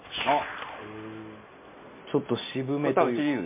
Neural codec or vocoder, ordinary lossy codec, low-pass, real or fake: none; MP3, 24 kbps; 3.6 kHz; real